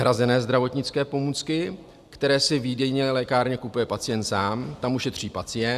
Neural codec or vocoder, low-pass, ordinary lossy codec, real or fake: none; 14.4 kHz; AAC, 96 kbps; real